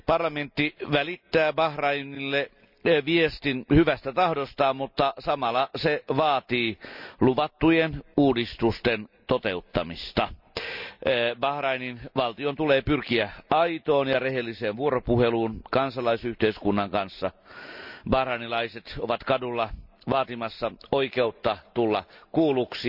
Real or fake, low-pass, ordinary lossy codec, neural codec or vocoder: real; 5.4 kHz; none; none